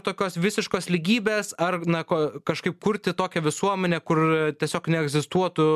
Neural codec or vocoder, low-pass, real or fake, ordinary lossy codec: none; 14.4 kHz; real; MP3, 96 kbps